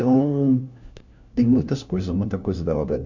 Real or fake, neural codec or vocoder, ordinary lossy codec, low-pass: fake; codec, 16 kHz, 1 kbps, FunCodec, trained on LibriTTS, 50 frames a second; none; 7.2 kHz